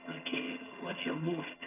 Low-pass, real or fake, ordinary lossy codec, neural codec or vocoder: 3.6 kHz; fake; none; vocoder, 22.05 kHz, 80 mel bands, HiFi-GAN